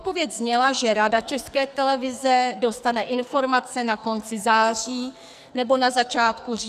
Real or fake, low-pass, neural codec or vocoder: fake; 14.4 kHz; codec, 44.1 kHz, 2.6 kbps, SNAC